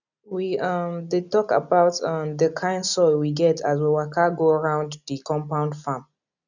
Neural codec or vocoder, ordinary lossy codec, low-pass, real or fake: none; none; 7.2 kHz; real